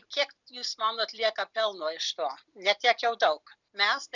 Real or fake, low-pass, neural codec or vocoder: real; 7.2 kHz; none